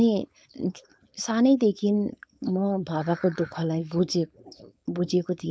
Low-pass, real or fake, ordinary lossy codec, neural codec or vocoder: none; fake; none; codec, 16 kHz, 4.8 kbps, FACodec